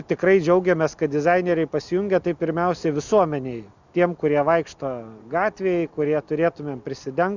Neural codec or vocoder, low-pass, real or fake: none; 7.2 kHz; real